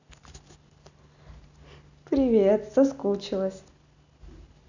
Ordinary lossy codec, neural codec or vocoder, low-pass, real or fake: none; none; 7.2 kHz; real